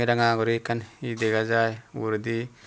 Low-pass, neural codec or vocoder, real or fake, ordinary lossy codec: none; none; real; none